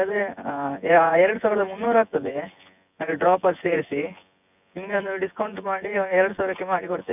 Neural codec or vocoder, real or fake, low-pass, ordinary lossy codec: vocoder, 24 kHz, 100 mel bands, Vocos; fake; 3.6 kHz; none